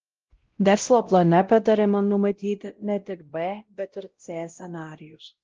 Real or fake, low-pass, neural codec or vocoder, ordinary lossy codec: fake; 7.2 kHz; codec, 16 kHz, 0.5 kbps, X-Codec, WavLM features, trained on Multilingual LibriSpeech; Opus, 16 kbps